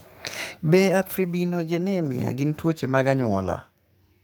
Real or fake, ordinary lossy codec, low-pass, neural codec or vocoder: fake; none; none; codec, 44.1 kHz, 2.6 kbps, SNAC